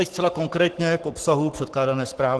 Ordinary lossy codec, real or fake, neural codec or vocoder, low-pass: Opus, 16 kbps; fake; codec, 44.1 kHz, 7.8 kbps, Pupu-Codec; 10.8 kHz